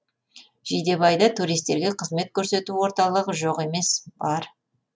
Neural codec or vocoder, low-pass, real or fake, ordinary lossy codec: none; none; real; none